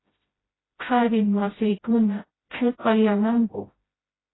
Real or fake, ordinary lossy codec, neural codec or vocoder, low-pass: fake; AAC, 16 kbps; codec, 16 kHz, 0.5 kbps, FreqCodec, smaller model; 7.2 kHz